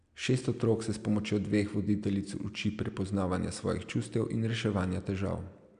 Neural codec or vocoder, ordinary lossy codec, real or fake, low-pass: none; MP3, 96 kbps; real; 9.9 kHz